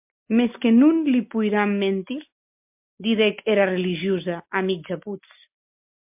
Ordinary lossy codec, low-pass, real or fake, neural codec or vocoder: MP3, 32 kbps; 3.6 kHz; real; none